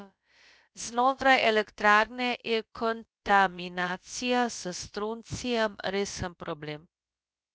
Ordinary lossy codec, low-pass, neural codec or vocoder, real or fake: none; none; codec, 16 kHz, about 1 kbps, DyCAST, with the encoder's durations; fake